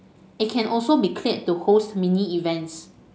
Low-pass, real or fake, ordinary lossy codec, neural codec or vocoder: none; real; none; none